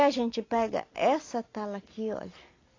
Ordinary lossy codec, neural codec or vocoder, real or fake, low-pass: AAC, 32 kbps; none; real; 7.2 kHz